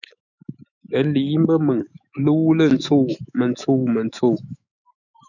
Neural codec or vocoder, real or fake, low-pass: autoencoder, 48 kHz, 128 numbers a frame, DAC-VAE, trained on Japanese speech; fake; 7.2 kHz